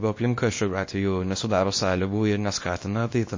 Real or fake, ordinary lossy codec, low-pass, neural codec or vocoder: fake; MP3, 32 kbps; 7.2 kHz; codec, 24 kHz, 0.9 kbps, WavTokenizer, small release